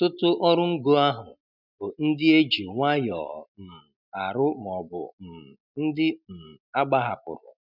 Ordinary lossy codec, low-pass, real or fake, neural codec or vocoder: none; 5.4 kHz; real; none